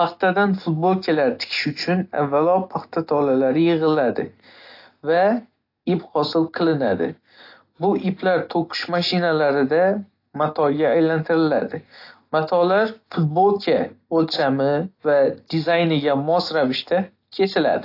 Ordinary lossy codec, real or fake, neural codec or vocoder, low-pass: AAC, 32 kbps; real; none; 5.4 kHz